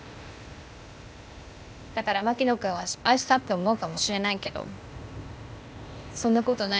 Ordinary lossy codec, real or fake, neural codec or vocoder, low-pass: none; fake; codec, 16 kHz, 0.8 kbps, ZipCodec; none